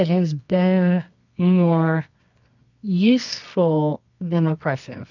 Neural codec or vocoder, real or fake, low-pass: codec, 24 kHz, 0.9 kbps, WavTokenizer, medium music audio release; fake; 7.2 kHz